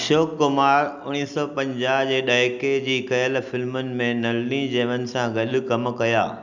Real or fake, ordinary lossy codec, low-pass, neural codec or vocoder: real; none; 7.2 kHz; none